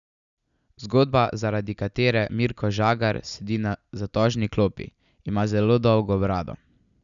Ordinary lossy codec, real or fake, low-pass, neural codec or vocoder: none; real; 7.2 kHz; none